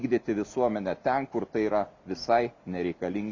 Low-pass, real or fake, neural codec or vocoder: 7.2 kHz; real; none